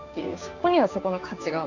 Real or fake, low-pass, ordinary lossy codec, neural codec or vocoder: fake; 7.2 kHz; Opus, 64 kbps; codec, 16 kHz, 6 kbps, DAC